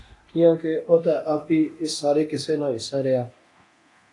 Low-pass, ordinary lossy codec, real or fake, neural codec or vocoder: 10.8 kHz; AAC, 48 kbps; fake; codec, 24 kHz, 0.9 kbps, DualCodec